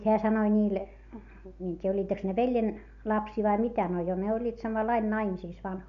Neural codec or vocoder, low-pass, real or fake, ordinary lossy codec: none; 7.2 kHz; real; none